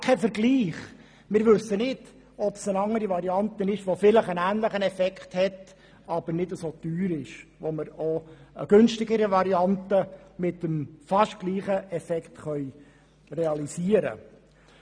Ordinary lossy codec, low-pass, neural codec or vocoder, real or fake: none; 9.9 kHz; none; real